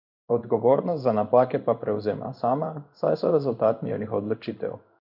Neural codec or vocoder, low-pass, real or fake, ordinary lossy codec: codec, 16 kHz in and 24 kHz out, 1 kbps, XY-Tokenizer; 5.4 kHz; fake; none